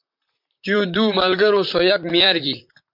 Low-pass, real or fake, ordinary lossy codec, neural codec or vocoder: 5.4 kHz; fake; MP3, 48 kbps; vocoder, 44.1 kHz, 128 mel bands, Pupu-Vocoder